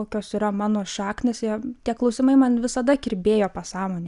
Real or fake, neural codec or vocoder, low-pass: real; none; 10.8 kHz